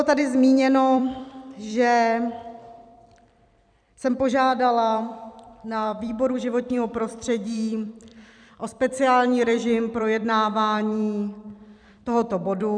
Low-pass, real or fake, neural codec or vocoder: 9.9 kHz; fake; vocoder, 44.1 kHz, 128 mel bands every 256 samples, BigVGAN v2